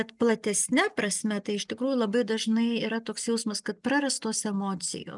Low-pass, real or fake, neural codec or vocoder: 10.8 kHz; real; none